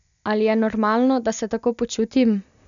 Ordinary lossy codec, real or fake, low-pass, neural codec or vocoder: none; real; 7.2 kHz; none